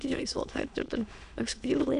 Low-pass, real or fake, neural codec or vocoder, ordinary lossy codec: 9.9 kHz; fake; autoencoder, 22.05 kHz, a latent of 192 numbers a frame, VITS, trained on many speakers; none